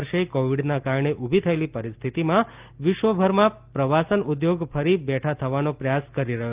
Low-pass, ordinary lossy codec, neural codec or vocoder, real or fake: 3.6 kHz; Opus, 24 kbps; none; real